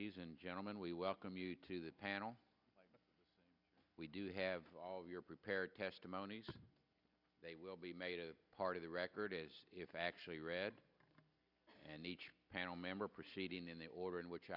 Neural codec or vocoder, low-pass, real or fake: none; 5.4 kHz; real